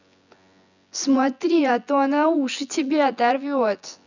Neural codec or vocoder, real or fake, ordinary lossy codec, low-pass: vocoder, 24 kHz, 100 mel bands, Vocos; fake; none; 7.2 kHz